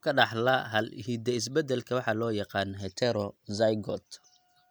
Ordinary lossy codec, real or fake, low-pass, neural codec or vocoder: none; real; none; none